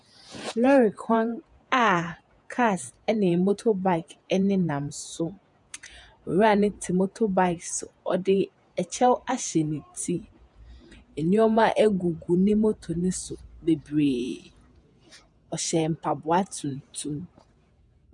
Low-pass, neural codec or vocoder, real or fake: 10.8 kHz; vocoder, 48 kHz, 128 mel bands, Vocos; fake